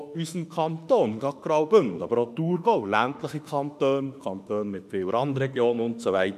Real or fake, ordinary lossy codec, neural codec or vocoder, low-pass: fake; none; autoencoder, 48 kHz, 32 numbers a frame, DAC-VAE, trained on Japanese speech; 14.4 kHz